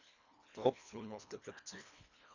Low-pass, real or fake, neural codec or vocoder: 7.2 kHz; fake; codec, 24 kHz, 1.5 kbps, HILCodec